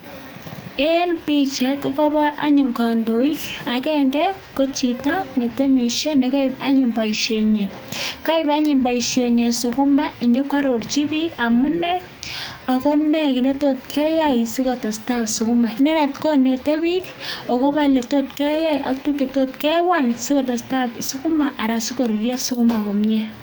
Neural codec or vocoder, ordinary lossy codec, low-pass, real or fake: codec, 44.1 kHz, 2.6 kbps, SNAC; none; none; fake